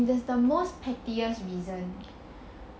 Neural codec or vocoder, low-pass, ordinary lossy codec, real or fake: none; none; none; real